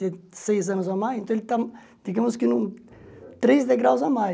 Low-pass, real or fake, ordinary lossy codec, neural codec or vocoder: none; real; none; none